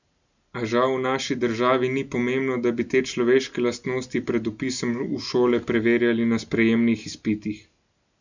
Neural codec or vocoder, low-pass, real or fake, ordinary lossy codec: none; 7.2 kHz; real; none